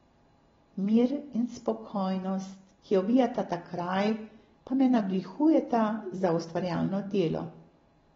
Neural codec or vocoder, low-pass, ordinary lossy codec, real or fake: none; 7.2 kHz; AAC, 24 kbps; real